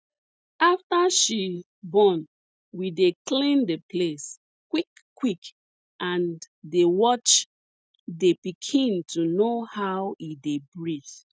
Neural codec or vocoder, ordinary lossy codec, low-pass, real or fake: none; none; none; real